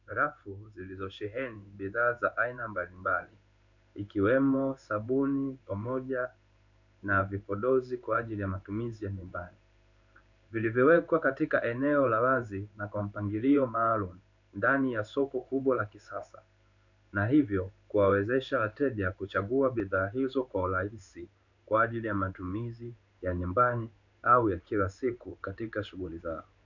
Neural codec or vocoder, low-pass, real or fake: codec, 16 kHz in and 24 kHz out, 1 kbps, XY-Tokenizer; 7.2 kHz; fake